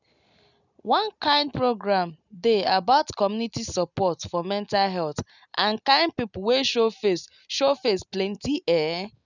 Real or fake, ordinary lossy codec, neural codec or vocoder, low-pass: real; none; none; 7.2 kHz